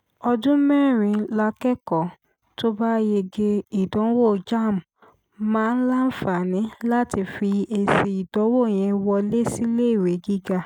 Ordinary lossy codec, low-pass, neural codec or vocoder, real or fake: none; 19.8 kHz; none; real